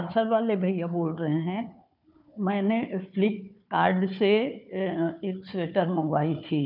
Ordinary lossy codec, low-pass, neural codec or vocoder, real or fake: none; 5.4 kHz; codec, 16 kHz, 4 kbps, FunCodec, trained on Chinese and English, 50 frames a second; fake